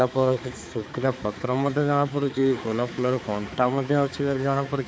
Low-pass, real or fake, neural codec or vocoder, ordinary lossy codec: none; fake; codec, 16 kHz, 4 kbps, X-Codec, HuBERT features, trained on balanced general audio; none